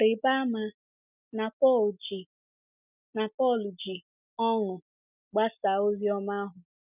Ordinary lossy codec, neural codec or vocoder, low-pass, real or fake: none; none; 3.6 kHz; real